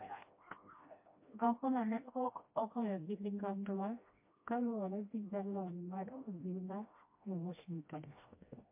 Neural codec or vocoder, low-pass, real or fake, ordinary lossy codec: codec, 16 kHz, 1 kbps, FreqCodec, smaller model; 3.6 kHz; fake; MP3, 24 kbps